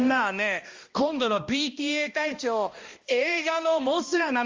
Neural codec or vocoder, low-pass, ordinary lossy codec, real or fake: codec, 16 kHz, 1 kbps, X-Codec, HuBERT features, trained on balanced general audio; 7.2 kHz; Opus, 32 kbps; fake